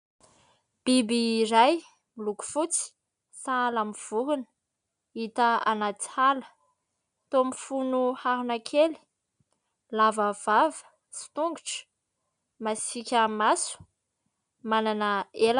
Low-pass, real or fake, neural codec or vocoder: 9.9 kHz; real; none